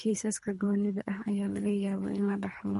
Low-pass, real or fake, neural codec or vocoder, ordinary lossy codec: 10.8 kHz; fake; codec, 24 kHz, 3 kbps, HILCodec; MP3, 48 kbps